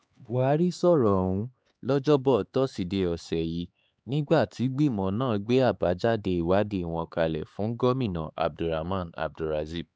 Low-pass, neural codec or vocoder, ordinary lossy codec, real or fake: none; codec, 16 kHz, 4 kbps, X-Codec, HuBERT features, trained on LibriSpeech; none; fake